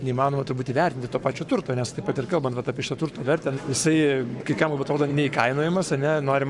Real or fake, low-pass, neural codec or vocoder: fake; 10.8 kHz; codec, 44.1 kHz, 7.8 kbps, Pupu-Codec